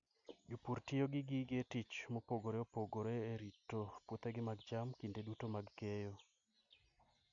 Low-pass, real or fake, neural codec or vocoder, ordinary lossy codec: 7.2 kHz; real; none; none